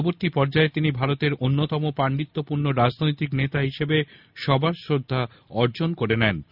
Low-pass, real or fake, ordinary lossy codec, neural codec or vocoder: 5.4 kHz; real; none; none